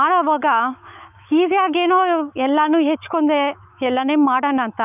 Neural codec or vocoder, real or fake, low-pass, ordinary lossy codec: autoencoder, 48 kHz, 128 numbers a frame, DAC-VAE, trained on Japanese speech; fake; 3.6 kHz; none